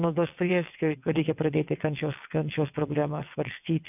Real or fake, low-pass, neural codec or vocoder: fake; 3.6 kHz; vocoder, 22.05 kHz, 80 mel bands, WaveNeXt